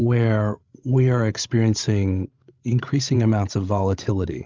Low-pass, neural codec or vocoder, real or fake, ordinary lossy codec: 7.2 kHz; codec, 16 kHz, 16 kbps, FreqCodec, larger model; fake; Opus, 32 kbps